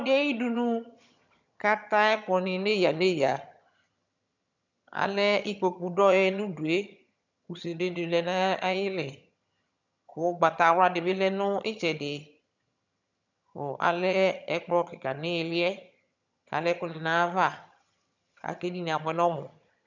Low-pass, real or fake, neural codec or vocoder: 7.2 kHz; fake; vocoder, 22.05 kHz, 80 mel bands, HiFi-GAN